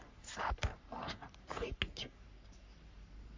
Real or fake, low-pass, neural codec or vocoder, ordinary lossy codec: fake; 7.2 kHz; codec, 44.1 kHz, 3.4 kbps, Pupu-Codec; MP3, 48 kbps